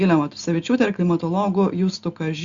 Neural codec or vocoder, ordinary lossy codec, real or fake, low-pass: none; Opus, 64 kbps; real; 7.2 kHz